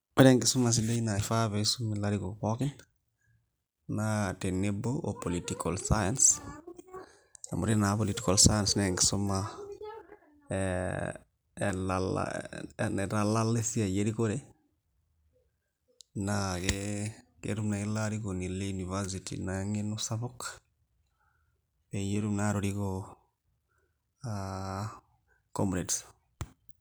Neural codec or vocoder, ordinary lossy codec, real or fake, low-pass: none; none; real; none